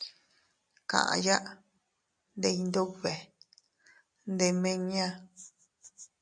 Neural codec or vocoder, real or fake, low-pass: none; real; 9.9 kHz